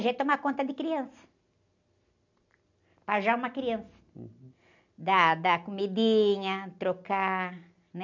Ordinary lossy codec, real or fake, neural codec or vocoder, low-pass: none; real; none; 7.2 kHz